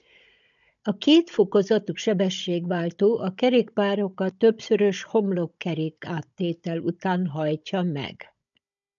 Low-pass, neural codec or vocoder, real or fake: 7.2 kHz; codec, 16 kHz, 16 kbps, FunCodec, trained on Chinese and English, 50 frames a second; fake